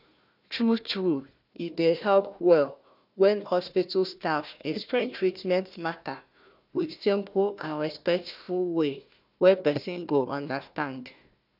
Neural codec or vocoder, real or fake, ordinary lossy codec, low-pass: codec, 16 kHz, 1 kbps, FunCodec, trained on Chinese and English, 50 frames a second; fake; none; 5.4 kHz